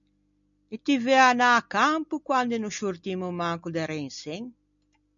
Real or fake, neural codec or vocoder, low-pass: real; none; 7.2 kHz